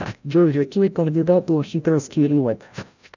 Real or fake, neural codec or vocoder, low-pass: fake; codec, 16 kHz, 0.5 kbps, FreqCodec, larger model; 7.2 kHz